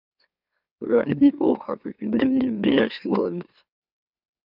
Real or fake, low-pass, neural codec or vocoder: fake; 5.4 kHz; autoencoder, 44.1 kHz, a latent of 192 numbers a frame, MeloTTS